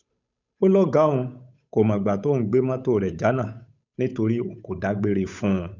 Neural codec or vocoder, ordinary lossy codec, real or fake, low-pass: codec, 16 kHz, 8 kbps, FunCodec, trained on Chinese and English, 25 frames a second; none; fake; 7.2 kHz